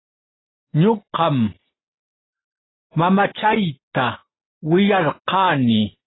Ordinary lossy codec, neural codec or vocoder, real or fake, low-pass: AAC, 16 kbps; none; real; 7.2 kHz